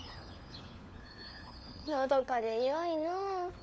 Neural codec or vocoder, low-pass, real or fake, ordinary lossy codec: codec, 16 kHz, 4 kbps, FunCodec, trained on LibriTTS, 50 frames a second; none; fake; none